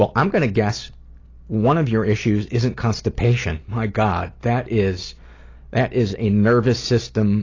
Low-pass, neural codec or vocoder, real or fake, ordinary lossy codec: 7.2 kHz; none; real; AAC, 32 kbps